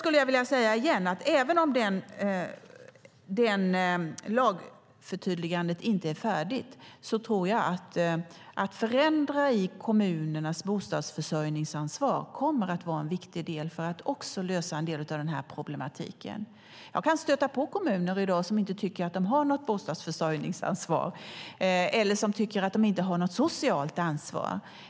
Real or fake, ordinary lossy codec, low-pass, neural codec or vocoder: real; none; none; none